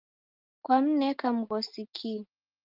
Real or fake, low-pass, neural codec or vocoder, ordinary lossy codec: real; 5.4 kHz; none; Opus, 32 kbps